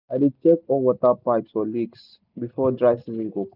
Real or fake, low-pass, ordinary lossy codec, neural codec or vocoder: real; 5.4 kHz; none; none